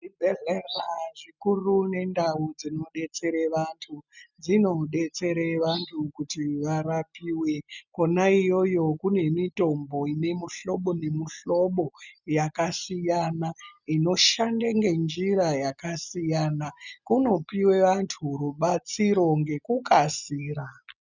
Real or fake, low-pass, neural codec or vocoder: real; 7.2 kHz; none